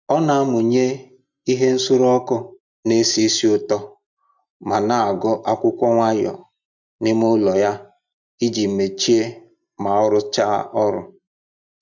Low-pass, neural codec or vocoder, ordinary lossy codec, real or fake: 7.2 kHz; none; none; real